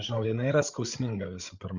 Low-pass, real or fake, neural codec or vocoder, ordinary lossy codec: 7.2 kHz; fake; codec, 16 kHz, 8 kbps, FreqCodec, larger model; Opus, 64 kbps